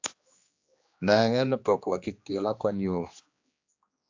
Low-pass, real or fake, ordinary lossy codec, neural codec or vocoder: 7.2 kHz; fake; AAC, 48 kbps; codec, 16 kHz, 2 kbps, X-Codec, HuBERT features, trained on general audio